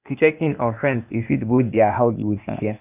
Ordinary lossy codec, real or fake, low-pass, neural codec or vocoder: none; fake; 3.6 kHz; codec, 16 kHz, 0.8 kbps, ZipCodec